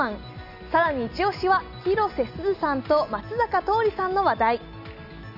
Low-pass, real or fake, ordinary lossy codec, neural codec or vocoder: 5.4 kHz; real; none; none